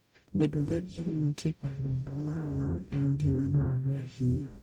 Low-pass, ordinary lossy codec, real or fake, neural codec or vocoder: 19.8 kHz; none; fake; codec, 44.1 kHz, 0.9 kbps, DAC